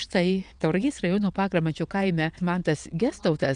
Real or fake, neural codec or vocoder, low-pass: fake; vocoder, 22.05 kHz, 80 mel bands, WaveNeXt; 9.9 kHz